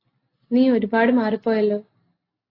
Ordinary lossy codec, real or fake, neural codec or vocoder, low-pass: AAC, 24 kbps; real; none; 5.4 kHz